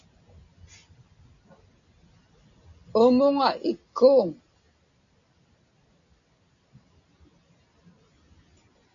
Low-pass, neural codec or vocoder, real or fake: 7.2 kHz; none; real